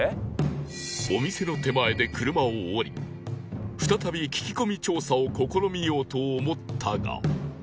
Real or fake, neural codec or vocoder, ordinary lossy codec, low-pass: real; none; none; none